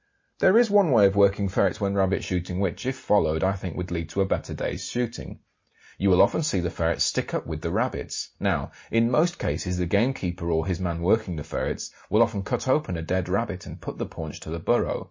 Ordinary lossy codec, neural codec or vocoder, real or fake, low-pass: MP3, 32 kbps; none; real; 7.2 kHz